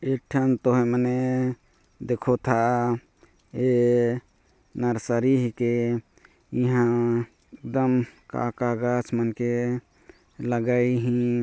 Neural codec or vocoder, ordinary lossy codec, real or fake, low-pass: none; none; real; none